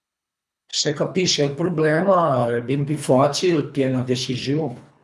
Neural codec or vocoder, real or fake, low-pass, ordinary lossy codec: codec, 24 kHz, 3 kbps, HILCodec; fake; none; none